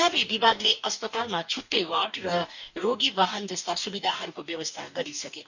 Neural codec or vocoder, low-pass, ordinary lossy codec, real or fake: codec, 44.1 kHz, 2.6 kbps, DAC; 7.2 kHz; none; fake